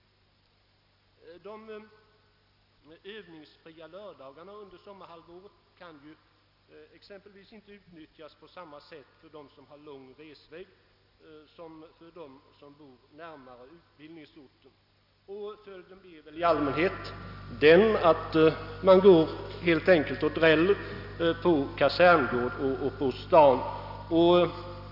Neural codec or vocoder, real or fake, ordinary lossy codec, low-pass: none; real; none; 5.4 kHz